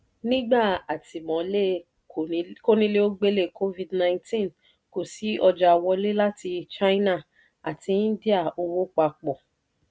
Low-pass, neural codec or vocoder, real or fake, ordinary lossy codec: none; none; real; none